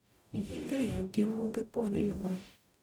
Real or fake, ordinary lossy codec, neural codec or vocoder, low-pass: fake; none; codec, 44.1 kHz, 0.9 kbps, DAC; none